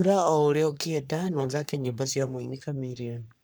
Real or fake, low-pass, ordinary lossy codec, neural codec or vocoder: fake; none; none; codec, 44.1 kHz, 3.4 kbps, Pupu-Codec